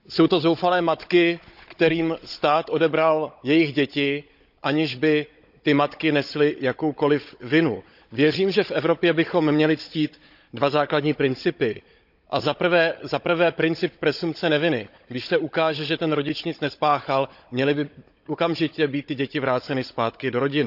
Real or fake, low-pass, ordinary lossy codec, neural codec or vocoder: fake; 5.4 kHz; none; codec, 16 kHz, 16 kbps, FunCodec, trained on Chinese and English, 50 frames a second